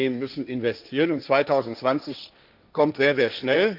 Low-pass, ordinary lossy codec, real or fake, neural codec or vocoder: 5.4 kHz; none; fake; codec, 16 kHz, 1.1 kbps, Voila-Tokenizer